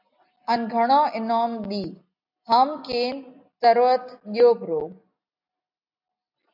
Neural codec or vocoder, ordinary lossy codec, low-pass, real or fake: none; AAC, 48 kbps; 5.4 kHz; real